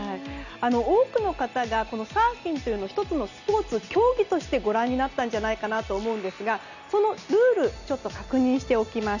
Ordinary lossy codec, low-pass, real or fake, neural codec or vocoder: none; 7.2 kHz; real; none